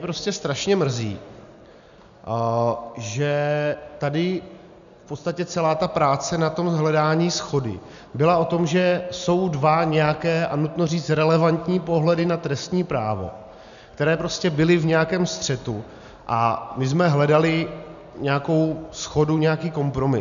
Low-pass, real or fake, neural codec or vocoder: 7.2 kHz; real; none